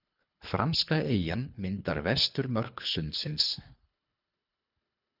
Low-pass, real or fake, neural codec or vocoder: 5.4 kHz; fake; codec, 24 kHz, 3 kbps, HILCodec